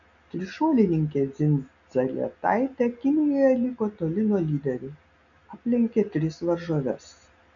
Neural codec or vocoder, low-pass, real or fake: none; 7.2 kHz; real